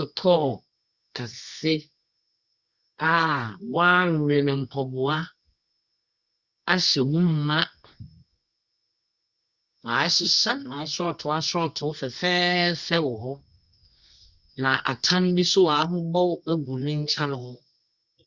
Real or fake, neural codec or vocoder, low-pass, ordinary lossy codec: fake; codec, 24 kHz, 0.9 kbps, WavTokenizer, medium music audio release; 7.2 kHz; Opus, 64 kbps